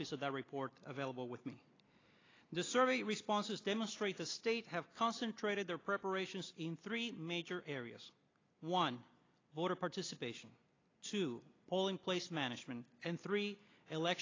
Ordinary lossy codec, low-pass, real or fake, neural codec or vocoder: AAC, 32 kbps; 7.2 kHz; real; none